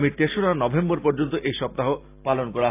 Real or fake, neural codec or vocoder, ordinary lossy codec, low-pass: real; none; AAC, 24 kbps; 3.6 kHz